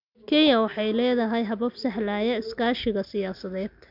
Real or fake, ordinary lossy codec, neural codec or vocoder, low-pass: real; none; none; 5.4 kHz